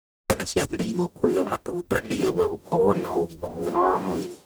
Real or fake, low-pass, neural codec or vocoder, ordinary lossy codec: fake; none; codec, 44.1 kHz, 0.9 kbps, DAC; none